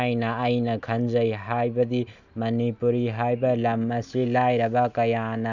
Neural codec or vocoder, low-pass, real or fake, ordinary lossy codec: none; 7.2 kHz; real; none